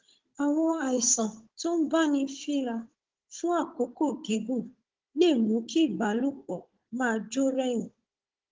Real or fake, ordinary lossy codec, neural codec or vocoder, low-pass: fake; Opus, 16 kbps; codec, 16 kHz, 16 kbps, FunCodec, trained on Chinese and English, 50 frames a second; 7.2 kHz